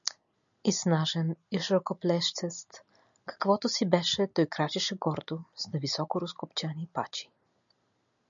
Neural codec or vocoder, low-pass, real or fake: none; 7.2 kHz; real